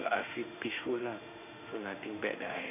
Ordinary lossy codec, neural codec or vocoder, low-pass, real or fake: none; autoencoder, 48 kHz, 32 numbers a frame, DAC-VAE, trained on Japanese speech; 3.6 kHz; fake